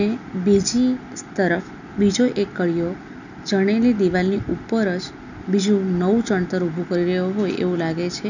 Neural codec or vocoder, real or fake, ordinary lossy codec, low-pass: none; real; none; 7.2 kHz